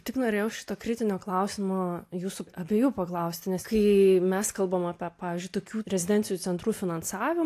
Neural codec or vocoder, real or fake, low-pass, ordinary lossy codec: none; real; 14.4 kHz; AAC, 64 kbps